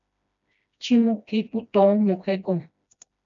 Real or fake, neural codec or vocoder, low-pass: fake; codec, 16 kHz, 1 kbps, FreqCodec, smaller model; 7.2 kHz